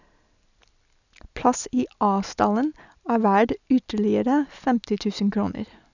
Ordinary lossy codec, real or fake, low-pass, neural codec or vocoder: none; real; 7.2 kHz; none